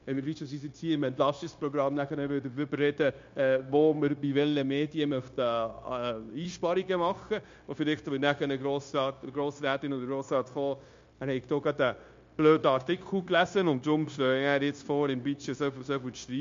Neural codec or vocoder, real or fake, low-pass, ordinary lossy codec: codec, 16 kHz, 0.9 kbps, LongCat-Audio-Codec; fake; 7.2 kHz; MP3, 48 kbps